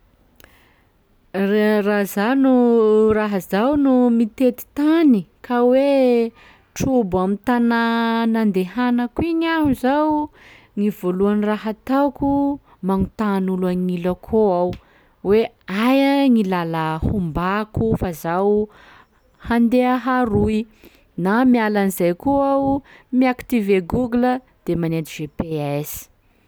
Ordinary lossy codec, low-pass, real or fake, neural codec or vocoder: none; none; real; none